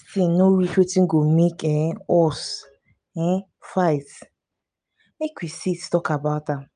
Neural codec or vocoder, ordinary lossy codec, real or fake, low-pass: none; none; real; 9.9 kHz